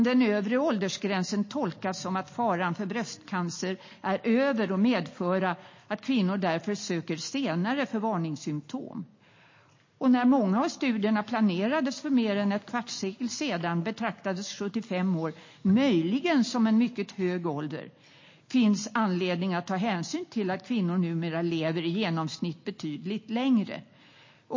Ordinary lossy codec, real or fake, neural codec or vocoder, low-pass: MP3, 32 kbps; real; none; 7.2 kHz